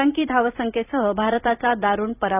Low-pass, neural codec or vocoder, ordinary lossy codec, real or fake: 3.6 kHz; vocoder, 44.1 kHz, 128 mel bands every 256 samples, BigVGAN v2; none; fake